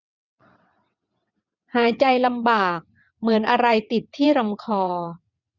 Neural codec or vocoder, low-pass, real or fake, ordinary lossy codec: vocoder, 22.05 kHz, 80 mel bands, WaveNeXt; 7.2 kHz; fake; none